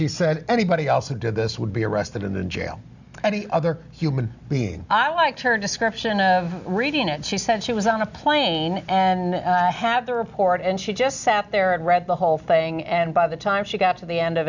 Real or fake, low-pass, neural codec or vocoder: real; 7.2 kHz; none